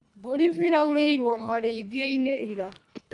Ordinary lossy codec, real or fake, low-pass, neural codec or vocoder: none; fake; 10.8 kHz; codec, 24 kHz, 1.5 kbps, HILCodec